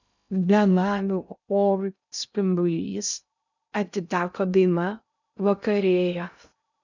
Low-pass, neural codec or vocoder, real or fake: 7.2 kHz; codec, 16 kHz in and 24 kHz out, 0.6 kbps, FocalCodec, streaming, 2048 codes; fake